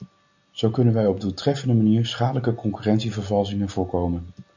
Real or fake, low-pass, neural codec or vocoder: real; 7.2 kHz; none